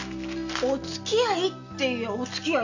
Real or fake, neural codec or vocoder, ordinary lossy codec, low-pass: real; none; none; 7.2 kHz